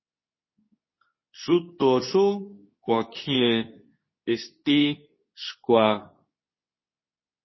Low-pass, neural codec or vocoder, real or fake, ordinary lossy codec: 7.2 kHz; codec, 24 kHz, 0.9 kbps, WavTokenizer, medium speech release version 2; fake; MP3, 24 kbps